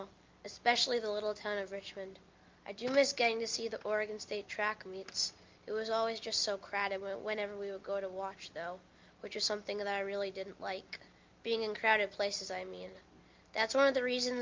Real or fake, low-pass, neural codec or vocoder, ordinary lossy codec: real; 7.2 kHz; none; Opus, 24 kbps